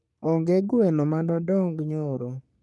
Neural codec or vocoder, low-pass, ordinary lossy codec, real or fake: codec, 44.1 kHz, 3.4 kbps, Pupu-Codec; 10.8 kHz; none; fake